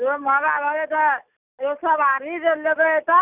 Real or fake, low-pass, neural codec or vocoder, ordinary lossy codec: real; 3.6 kHz; none; none